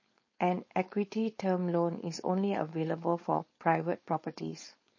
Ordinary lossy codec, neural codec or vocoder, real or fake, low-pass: MP3, 32 kbps; codec, 16 kHz, 4.8 kbps, FACodec; fake; 7.2 kHz